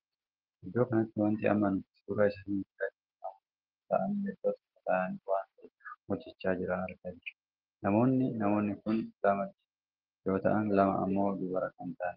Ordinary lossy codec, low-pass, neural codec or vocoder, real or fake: Opus, 16 kbps; 5.4 kHz; none; real